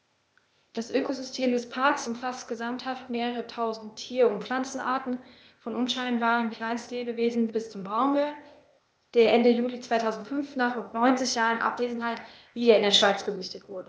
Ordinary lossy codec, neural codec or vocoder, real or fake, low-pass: none; codec, 16 kHz, 0.8 kbps, ZipCodec; fake; none